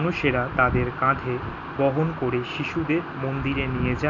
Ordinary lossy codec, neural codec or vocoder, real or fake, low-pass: none; none; real; 7.2 kHz